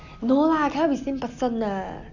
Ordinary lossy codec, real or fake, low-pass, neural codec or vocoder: none; real; 7.2 kHz; none